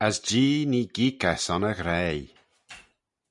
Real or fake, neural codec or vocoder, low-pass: real; none; 10.8 kHz